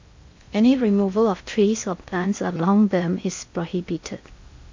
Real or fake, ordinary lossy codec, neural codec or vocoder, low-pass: fake; MP3, 48 kbps; codec, 16 kHz in and 24 kHz out, 0.6 kbps, FocalCodec, streaming, 2048 codes; 7.2 kHz